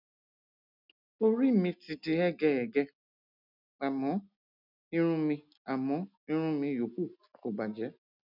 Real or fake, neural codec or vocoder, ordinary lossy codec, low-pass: real; none; none; 5.4 kHz